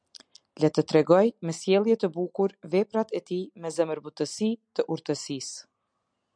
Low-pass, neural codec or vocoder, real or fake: 9.9 kHz; none; real